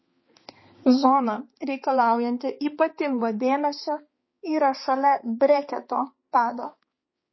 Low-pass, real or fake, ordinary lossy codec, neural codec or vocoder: 7.2 kHz; fake; MP3, 24 kbps; codec, 16 kHz, 4 kbps, X-Codec, HuBERT features, trained on balanced general audio